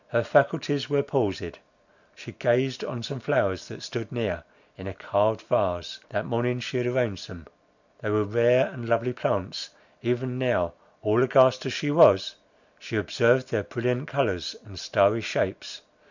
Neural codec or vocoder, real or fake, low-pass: none; real; 7.2 kHz